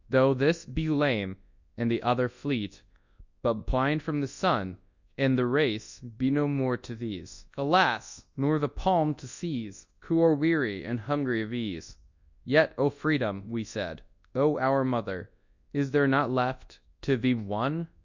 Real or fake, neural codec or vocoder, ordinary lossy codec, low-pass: fake; codec, 24 kHz, 0.9 kbps, WavTokenizer, large speech release; Opus, 64 kbps; 7.2 kHz